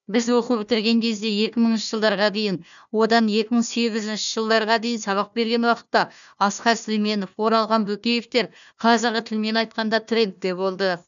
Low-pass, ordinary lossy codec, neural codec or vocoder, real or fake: 7.2 kHz; none; codec, 16 kHz, 1 kbps, FunCodec, trained on Chinese and English, 50 frames a second; fake